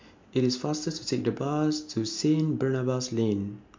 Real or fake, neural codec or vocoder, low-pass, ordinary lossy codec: real; none; 7.2 kHz; MP3, 48 kbps